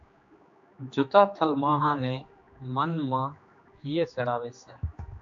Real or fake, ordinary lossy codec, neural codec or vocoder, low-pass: fake; AAC, 64 kbps; codec, 16 kHz, 2 kbps, X-Codec, HuBERT features, trained on general audio; 7.2 kHz